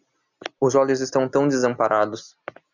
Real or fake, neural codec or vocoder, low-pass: real; none; 7.2 kHz